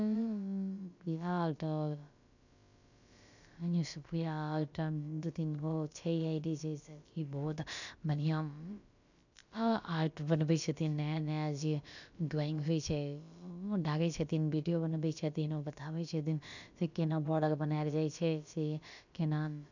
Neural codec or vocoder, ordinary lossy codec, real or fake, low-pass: codec, 16 kHz, about 1 kbps, DyCAST, with the encoder's durations; none; fake; 7.2 kHz